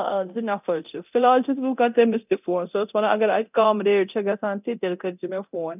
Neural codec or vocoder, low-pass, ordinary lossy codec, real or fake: codec, 24 kHz, 0.9 kbps, DualCodec; 3.6 kHz; none; fake